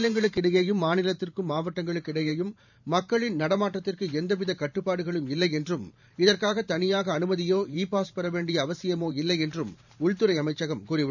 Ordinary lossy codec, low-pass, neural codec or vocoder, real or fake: none; 7.2 kHz; vocoder, 44.1 kHz, 128 mel bands every 512 samples, BigVGAN v2; fake